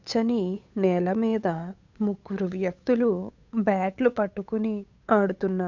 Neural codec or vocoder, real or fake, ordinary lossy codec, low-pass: codec, 16 kHz, 8 kbps, FunCodec, trained on Chinese and English, 25 frames a second; fake; none; 7.2 kHz